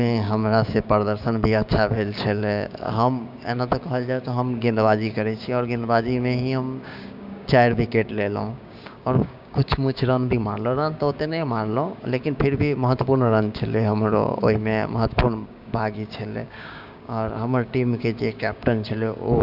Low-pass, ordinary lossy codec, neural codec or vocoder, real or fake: 5.4 kHz; none; codec, 16 kHz, 6 kbps, DAC; fake